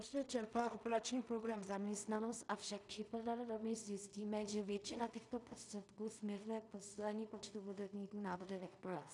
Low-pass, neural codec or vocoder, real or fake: 10.8 kHz; codec, 16 kHz in and 24 kHz out, 0.4 kbps, LongCat-Audio-Codec, two codebook decoder; fake